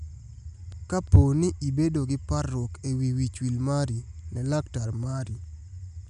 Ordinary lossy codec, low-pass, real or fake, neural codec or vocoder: none; 10.8 kHz; real; none